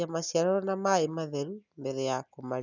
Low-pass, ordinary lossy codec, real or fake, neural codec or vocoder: 7.2 kHz; none; real; none